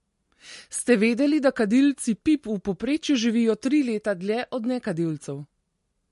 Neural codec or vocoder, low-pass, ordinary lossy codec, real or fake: none; 14.4 kHz; MP3, 48 kbps; real